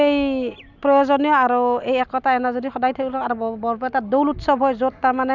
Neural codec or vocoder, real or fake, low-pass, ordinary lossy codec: none; real; 7.2 kHz; none